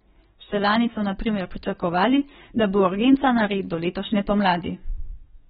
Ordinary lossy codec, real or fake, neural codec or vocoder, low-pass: AAC, 16 kbps; fake; codec, 44.1 kHz, 7.8 kbps, DAC; 19.8 kHz